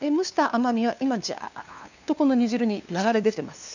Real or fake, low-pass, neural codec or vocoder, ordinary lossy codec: fake; 7.2 kHz; codec, 16 kHz, 2 kbps, FunCodec, trained on LibriTTS, 25 frames a second; none